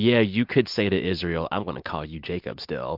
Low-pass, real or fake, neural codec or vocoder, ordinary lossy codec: 5.4 kHz; real; none; MP3, 48 kbps